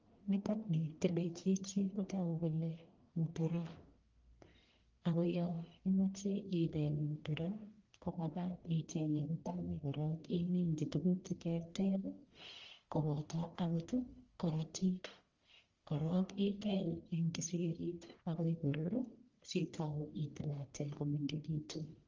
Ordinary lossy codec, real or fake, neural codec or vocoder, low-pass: Opus, 32 kbps; fake; codec, 44.1 kHz, 1.7 kbps, Pupu-Codec; 7.2 kHz